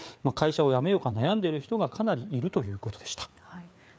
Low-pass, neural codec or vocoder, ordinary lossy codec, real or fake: none; codec, 16 kHz, 4 kbps, FreqCodec, larger model; none; fake